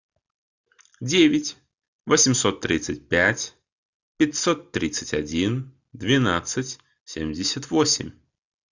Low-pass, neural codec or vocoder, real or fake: 7.2 kHz; none; real